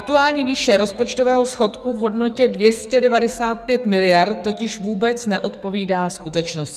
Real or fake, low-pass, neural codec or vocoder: fake; 14.4 kHz; codec, 44.1 kHz, 2.6 kbps, SNAC